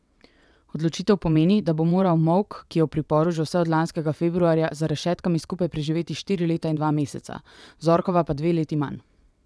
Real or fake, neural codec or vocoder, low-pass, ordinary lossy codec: fake; vocoder, 22.05 kHz, 80 mel bands, WaveNeXt; none; none